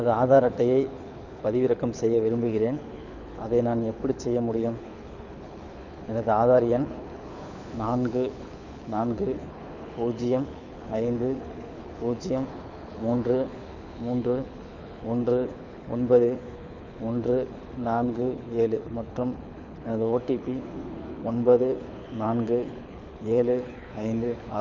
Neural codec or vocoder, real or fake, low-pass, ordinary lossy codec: codec, 24 kHz, 6 kbps, HILCodec; fake; 7.2 kHz; none